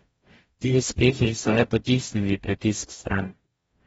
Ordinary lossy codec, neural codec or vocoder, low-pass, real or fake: AAC, 24 kbps; codec, 44.1 kHz, 0.9 kbps, DAC; 19.8 kHz; fake